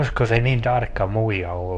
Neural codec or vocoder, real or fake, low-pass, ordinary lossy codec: codec, 24 kHz, 0.9 kbps, WavTokenizer, medium speech release version 2; fake; 10.8 kHz; none